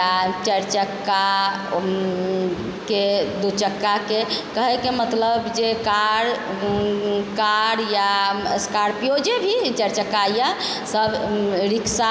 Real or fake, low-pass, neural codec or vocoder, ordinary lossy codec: real; none; none; none